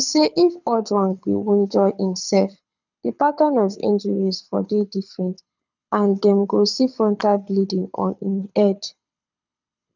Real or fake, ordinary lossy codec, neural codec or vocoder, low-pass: fake; none; codec, 24 kHz, 6 kbps, HILCodec; 7.2 kHz